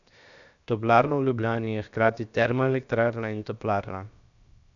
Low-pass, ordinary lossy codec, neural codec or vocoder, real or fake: 7.2 kHz; none; codec, 16 kHz, 0.7 kbps, FocalCodec; fake